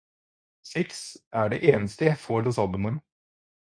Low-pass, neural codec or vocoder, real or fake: 9.9 kHz; codec, 24 kHz, 0.9 kbps, WavTokenizer, medium speech release version 2; fake